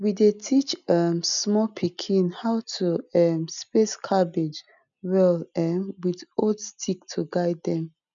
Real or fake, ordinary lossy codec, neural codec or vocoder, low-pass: real; none; none; 7.2 kHz